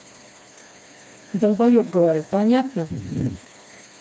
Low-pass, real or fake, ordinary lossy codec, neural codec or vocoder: none; fake; none; codec, 16 kHz, 2 kbps, FreqCodec, smaller model